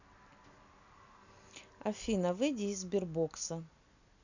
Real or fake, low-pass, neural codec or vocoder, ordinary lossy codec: real; 7.2 kHz; none; none